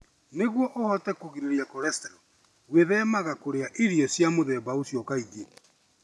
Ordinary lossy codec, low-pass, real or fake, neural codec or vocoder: none; none; real; none